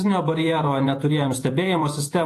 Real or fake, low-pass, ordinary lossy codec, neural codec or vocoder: fake; 14.4 kHz; AAC, 48 kbps; vocoder, 48 kHz, 128 mel bands, Vocos